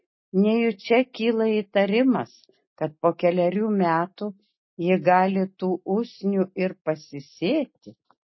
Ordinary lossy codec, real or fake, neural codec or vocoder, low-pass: MP3, 24 kbps; real; none; 7.2 kHz